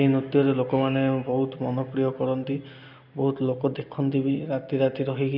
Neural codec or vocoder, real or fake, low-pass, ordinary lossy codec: none; real; 5.4 kHz; none